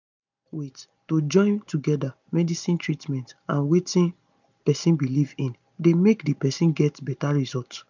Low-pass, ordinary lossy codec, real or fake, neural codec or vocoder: 7.2 kHz; none; real; none